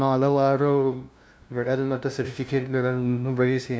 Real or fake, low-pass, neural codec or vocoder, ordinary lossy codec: fake; none; codec, 16 kHz, 0.5 kbps, FunCodec, trained on LibriTTS, 25 frames a second; none